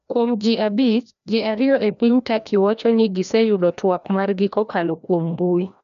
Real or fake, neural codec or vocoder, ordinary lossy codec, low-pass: fake; codec, 16 kHz, 1 kbps, FreqCodec, larger model; none; 7.2 kHz